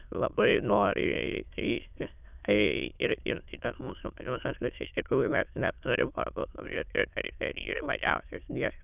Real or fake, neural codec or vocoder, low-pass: fake; autoencoder, 22.05 kHz, a latent of 192 numbers a frame, VITS, trained on many speakers; 3.6 kHz